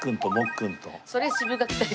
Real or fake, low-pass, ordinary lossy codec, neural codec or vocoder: real; none; none; none